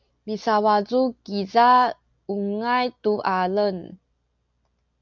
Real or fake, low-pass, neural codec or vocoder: real; 7.2 kHz; none